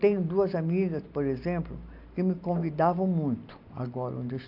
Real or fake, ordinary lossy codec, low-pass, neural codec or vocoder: real; none; 5.4 kHz; none